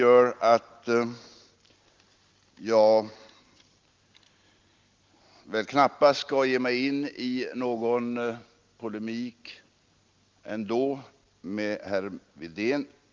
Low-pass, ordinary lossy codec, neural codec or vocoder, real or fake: 7.2 kHz; Opus, 32 kbps; none; real